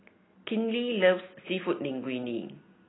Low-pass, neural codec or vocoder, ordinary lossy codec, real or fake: 7.2 kHz; none; AAC, 16 kbps; real